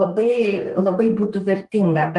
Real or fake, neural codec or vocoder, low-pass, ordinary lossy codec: fake; codec, 32 kHz, 1.9 kbps, SNAC; 10.8 kHz; Opus, 24 kbps